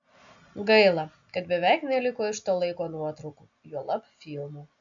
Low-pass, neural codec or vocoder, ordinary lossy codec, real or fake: 7.2 kHz; none; AAC, 64 kbps; real